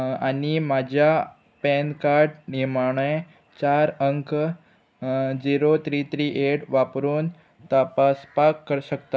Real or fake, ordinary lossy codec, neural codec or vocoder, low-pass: real; none; none; none